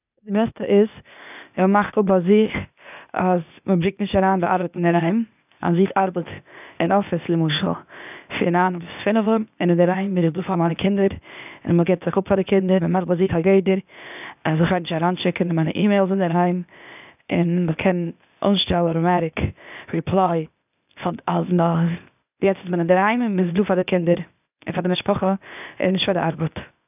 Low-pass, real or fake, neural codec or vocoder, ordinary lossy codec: 3.6 kHz; fake; codec, 16 kHz, 0.8 kbps, ZipCodec; none